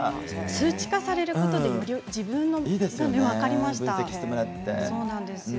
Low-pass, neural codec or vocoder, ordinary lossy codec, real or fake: none; none; none; real